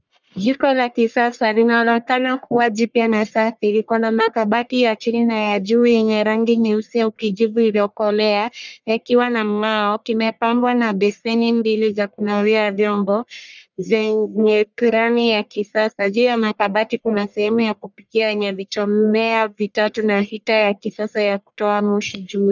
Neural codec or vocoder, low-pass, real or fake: codec, 44.1 kHz, 1.7 kbps, Pupu-Codec; 7.2 kHz; fake